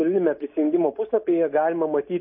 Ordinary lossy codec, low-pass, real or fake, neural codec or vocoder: MP3, 24 kbps; 5.4 kHz; real; none